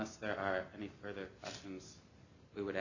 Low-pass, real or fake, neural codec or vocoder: 7.2 kHz; real; none